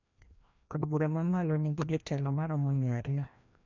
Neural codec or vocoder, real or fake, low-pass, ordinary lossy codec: codec, 16 kHz, 1 kbps, FreqCodec, larger model; fake; 7.2 kHz; none